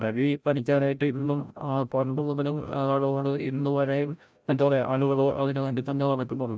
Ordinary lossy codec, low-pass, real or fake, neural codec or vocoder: none; none; fake; codec, 16 kHz, 0.5 kbps, FreqCodec, larger model